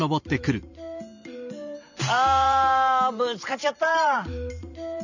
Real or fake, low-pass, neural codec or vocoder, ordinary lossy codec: fake; 7.2 kHz; vocoder, 44.1 kHz, 128 mel bands every 512 samples, BigVGAN v2; none